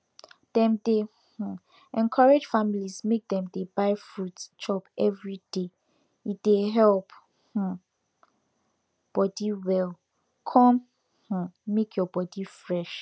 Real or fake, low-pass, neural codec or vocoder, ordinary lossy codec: real; none; none; none